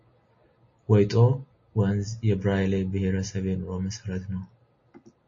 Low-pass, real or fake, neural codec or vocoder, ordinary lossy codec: 7.2 kHz; real; none; MP3, 32 kbps